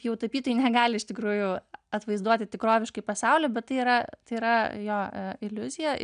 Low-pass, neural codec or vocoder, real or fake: 9.9 kHz; none; real